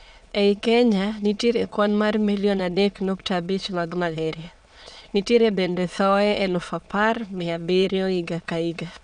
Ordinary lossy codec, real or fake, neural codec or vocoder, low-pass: none; fake; autoencoder, 22.05 kHz, a latent of 192 numbers a frame, VITS, trained on many speakers; 9.9 kHz